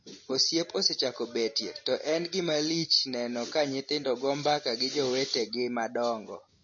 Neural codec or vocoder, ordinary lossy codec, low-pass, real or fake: none; MP3, 32 kbps; 7.2 kHz; real